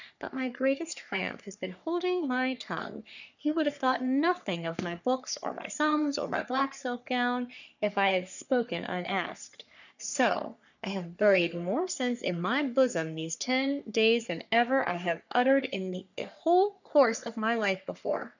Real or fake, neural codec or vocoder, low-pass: fake; codec, 44.1 kHz, 3.4 kbps, Pupu-Codec; 7.2 kHz